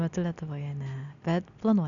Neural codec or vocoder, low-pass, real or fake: none; 7.2 kHz; real